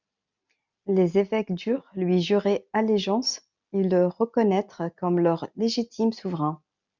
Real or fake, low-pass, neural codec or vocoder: real; 7.2 kHz; none